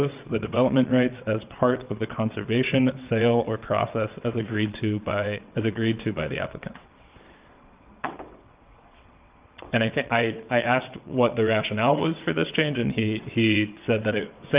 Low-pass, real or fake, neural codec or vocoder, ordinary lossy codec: 3.6 kHz; fake; vocoder, 22.05 kHz, 80 mel bands, WaveNeXt; Opus, 24 kbps